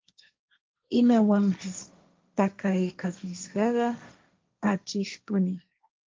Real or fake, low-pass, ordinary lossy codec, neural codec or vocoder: fake; 7.2 kHz; Opus, 24 kbps; codec, 16 kHz, 1.1 kbps, Voila-Tokenizer